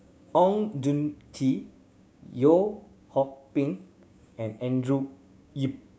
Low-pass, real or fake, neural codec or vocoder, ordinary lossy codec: none; fake; codec, 16 kHz, 6 kbps, DAC; none